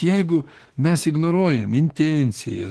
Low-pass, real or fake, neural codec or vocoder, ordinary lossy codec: 10.8 kHz; fake; autoencoder, 48 kHz, 32 numbers a frame, DAC-VAE, trained on Japanese speech; Opus, 16 kbps